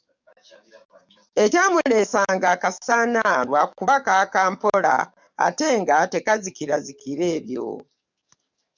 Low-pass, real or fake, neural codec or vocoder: 7.2 kHz; fake; codec, 44.1 kHz, 7.8 kbps, DAC